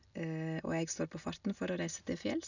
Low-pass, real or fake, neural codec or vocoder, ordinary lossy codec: 7.2 kHz; real; none; none